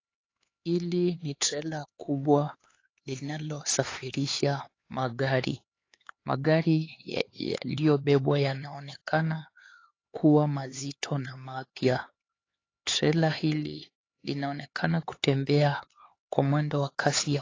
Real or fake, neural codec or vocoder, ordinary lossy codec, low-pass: fake; codec, 16 kHz, 4 kbps, X-Codec, HuBERT features, trained on LibriSpeech; AAC, 32 kbps; 7.2 kHz